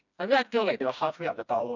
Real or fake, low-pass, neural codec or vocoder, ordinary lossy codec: fake; 7.2 kHz; codec, 16 kHz, 1 kbps, FreqCodec, smaller model; none